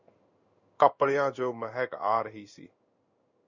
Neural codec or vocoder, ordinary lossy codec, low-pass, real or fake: codec, 16 kHz in and 24 kHz out, 1 kbps, XY-Tokenizer; Opus, 64 kbps; 7.2 kHz; fake